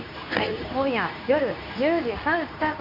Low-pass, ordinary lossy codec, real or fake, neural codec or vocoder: 5.4 kHz; none; fake; codec, 24 kHz, 0.9 kbps, WavTokenizer, medium speech release version 2